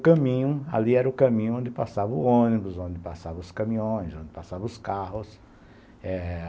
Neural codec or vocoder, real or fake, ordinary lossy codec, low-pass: none; real; none; none